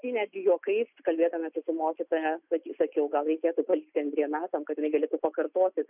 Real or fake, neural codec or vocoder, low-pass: real; none; 3.6 kHz